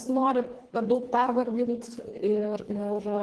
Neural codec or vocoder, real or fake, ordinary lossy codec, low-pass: codec, 24 kHz, 1.5 kbps, HILCodec; fake; Opus, 16 kbps; 10.8 kHz